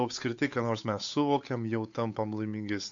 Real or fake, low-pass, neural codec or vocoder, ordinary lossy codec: fake; 7.2 kHz; codec, 16 kHz, 8 kbps, FunCodec, trained on Chinese and English, 25 frames a second; AAC, 48 kbps